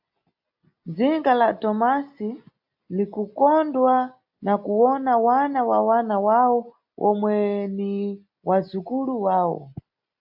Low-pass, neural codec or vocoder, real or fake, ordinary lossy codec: 5.4 kHz; none; real; AAC, 48 kbps